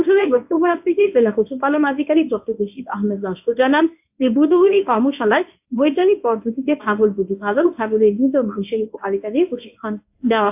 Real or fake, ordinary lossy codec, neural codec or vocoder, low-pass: fake; AAC, 32 kbps; codec, 24 kHz, 0.9 kbps, WavTokenizer, medium speech release version 1; 3.6 kHz